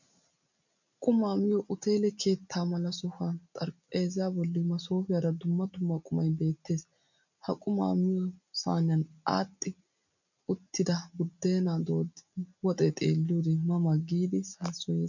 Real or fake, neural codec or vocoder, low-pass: real; none; 7.2 kHz